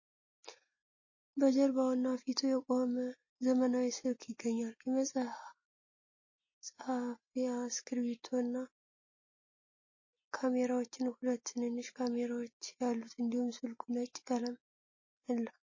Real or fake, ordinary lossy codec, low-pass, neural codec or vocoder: real; MP3, 32 kbps; 7.2 kHz; none